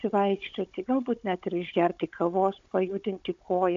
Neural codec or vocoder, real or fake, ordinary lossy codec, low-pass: codec, 16 kHz, 16 kbps, FunCodec, trained on Chinese and English, 50 frames a second; fake; AAC, 64 kbps; 7.2 kHz